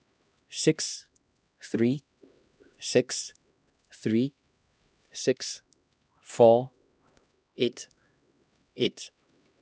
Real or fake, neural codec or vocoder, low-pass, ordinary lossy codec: fake; codec, 16 kHz, 1 kbps, X-Codec, HuBERT features, trained on LibriSpeech; none; none